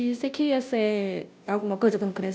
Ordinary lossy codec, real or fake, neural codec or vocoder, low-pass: none; fake; codec, 16 kHz, 0.5 kbps, FunCodec, trained on Chinese and English, 25 frames a second; none